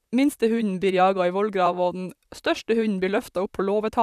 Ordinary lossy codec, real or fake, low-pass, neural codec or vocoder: none; fake; 14.4 kHz; vocoder, 44.1 kHz, 128 mel bands, Pupu-Vocoder